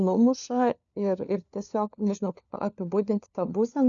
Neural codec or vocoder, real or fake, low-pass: codec, 16 kHz, 4 kbps, FunCodec, trained on LibriTTS, 50 frames a second; fake; 7.2 kHz